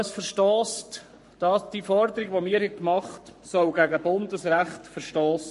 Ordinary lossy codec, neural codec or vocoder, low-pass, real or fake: MP3, 48 kbps; codec, 44.1 kHz, 7.8 kbps, Pupu-Codec; 14.4 kHz; fake